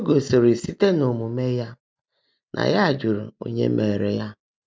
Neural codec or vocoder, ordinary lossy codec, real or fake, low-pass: none; none; real; none